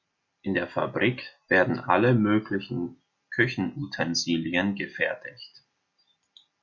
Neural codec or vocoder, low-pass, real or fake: none; 7.2 kHz; real